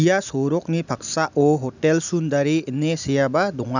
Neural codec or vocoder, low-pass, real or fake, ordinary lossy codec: none; 7.2 kHz; real; none